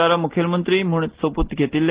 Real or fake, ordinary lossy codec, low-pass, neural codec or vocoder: real; Opus, 16 kbps; 3.6 kHz; none